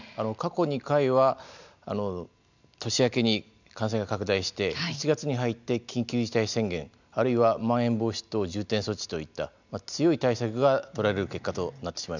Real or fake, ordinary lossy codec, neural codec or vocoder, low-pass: real; none; none; 7.2 kHz